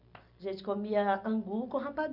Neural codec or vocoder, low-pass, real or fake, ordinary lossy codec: none; 5.4 kHz; real; none